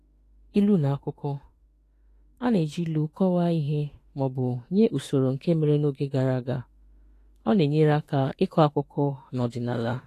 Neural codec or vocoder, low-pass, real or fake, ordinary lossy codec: autoencoder, 48 kHz, 32 numbers a frame, DAC-VAE, trained on Japanese speech; 14.4 kHz; fake; AAC, 48 kbps